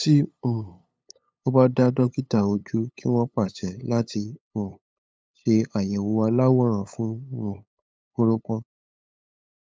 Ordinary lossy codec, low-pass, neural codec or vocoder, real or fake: none; none; codec, 16 kHz, 8 kbps, FunCodec, trained on LibriTTS, 25 frames a second; fake